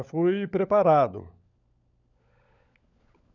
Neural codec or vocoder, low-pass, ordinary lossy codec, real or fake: codec, 16 kHz, 16 kbps, FunCodec, trained on LibriTTS, 50 frames a second; 7.2 kHz; none; fake